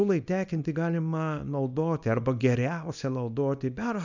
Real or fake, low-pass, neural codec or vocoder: fake; 7.2 kHz; codec, 24 kHz, 0.9 kbps, WavTokenizer, small release